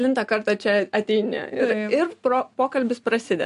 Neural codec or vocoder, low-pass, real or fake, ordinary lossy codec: none; 10.8 kHz; real; MP3, 64 kbps